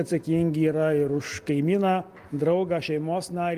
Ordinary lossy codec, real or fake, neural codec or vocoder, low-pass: Opus, 24 kbps; real; none; 14.4 kHz